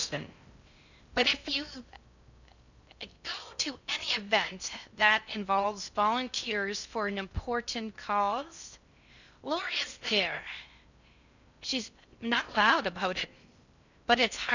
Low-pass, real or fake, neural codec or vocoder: 7.2 kHz; fake; codec, 16 kHz in and 24 kHz out, 0.6 kbps, FocalCodec, streaming, 4096 codes